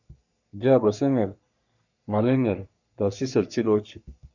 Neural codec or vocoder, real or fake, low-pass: codec, 44.1 kHz, 3.4 kbps, Pupu-Codec; fake; 7.2 kHz